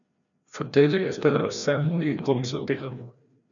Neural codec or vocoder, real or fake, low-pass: codec, 16 kHz, 1 kbps, FreqCodec, larger model; fake; 7.2 kHz